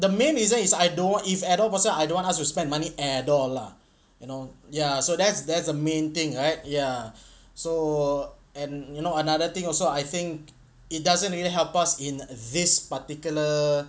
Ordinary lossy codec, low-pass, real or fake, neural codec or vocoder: none; none; real; none